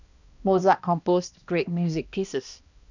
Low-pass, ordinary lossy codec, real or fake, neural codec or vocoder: 7.2 kHz; none; fake; codec, 16 kHz, 1 kbps, X-Codec, HuBERT features, trained on balanced general audio